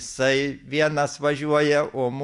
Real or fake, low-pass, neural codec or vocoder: real; 10.8 kHz; none